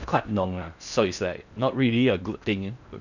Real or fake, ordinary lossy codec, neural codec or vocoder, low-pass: fake; none; codec, 16 kHz in and 24 kHz out, 0.6 kbps, FocalCodec, streaming, 4096 codes; 7.2 kHz